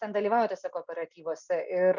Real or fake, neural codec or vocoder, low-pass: real; none; 7.2 kHz